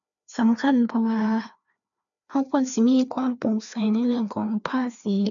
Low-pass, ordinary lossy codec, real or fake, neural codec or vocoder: 7.2 kHz; none; fake; codec, 16 kHz, 2 kbps, FreqCodec, larger model